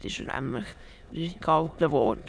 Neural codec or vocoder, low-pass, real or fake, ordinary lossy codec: autoencoder, 22.05 kHz, a latent of 192 numbers a frame, VITS, trained on many speakers; none; fake; none